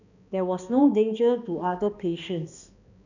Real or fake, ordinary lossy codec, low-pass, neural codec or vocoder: fake; none; 7.2 kHz; codec, 16 kHz, 2 kbps, X-Codec, HuBERT features, trained on balanced general audio